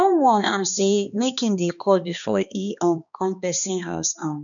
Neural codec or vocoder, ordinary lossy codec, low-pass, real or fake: codec, 16 kHz, 2 kbps, X-Codec, HuBERT features, trained on balanced general audio; none; 7.2 kHz; fake